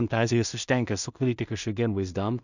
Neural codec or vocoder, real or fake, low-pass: codec, 16 kHz in and 24 kHz out, 0.4 kbps, LongCat-Audio-Codec, two codebook decoder; fake; 7.2 kHz